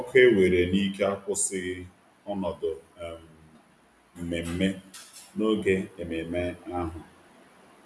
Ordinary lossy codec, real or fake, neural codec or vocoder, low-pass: none; real; none; none